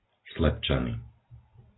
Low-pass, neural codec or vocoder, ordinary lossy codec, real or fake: 7.2 kHz; none; AAC, 16 kbps; real